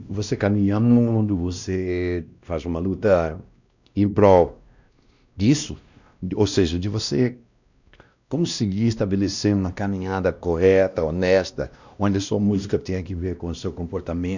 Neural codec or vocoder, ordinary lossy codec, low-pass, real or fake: codec, 16 kHz, 1 kbps, X-Codec, WavLM features, trained on Multilingual LibriSpeech; none; 7.2 kHz; fake